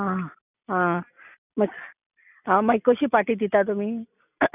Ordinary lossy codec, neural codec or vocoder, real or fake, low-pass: none; none; real; 3.6 kHz